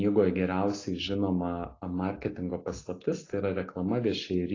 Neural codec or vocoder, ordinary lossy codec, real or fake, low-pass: none; AAC, 32 kbps; real; 7.2 kHz